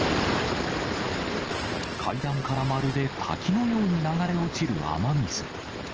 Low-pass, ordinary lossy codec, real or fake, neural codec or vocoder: 7.2 kHz; Opus, 16 kbps; real; none